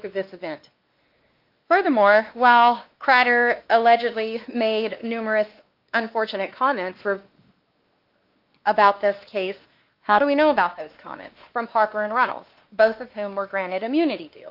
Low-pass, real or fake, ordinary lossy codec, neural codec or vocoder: 5.4 kHz; fake; Opus, 24 kbps; codec, 16 kHz, 2 kbps, X-Codec, WavLM features, trained on Multilingual LibriSpeech